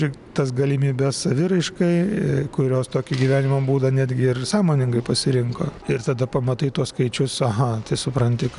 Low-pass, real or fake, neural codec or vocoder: 10.8 kHz; real; none